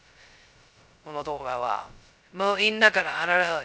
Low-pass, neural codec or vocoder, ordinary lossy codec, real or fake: none; codec, 16 kHz, 0.2 kbps, FocalCodec; none; fake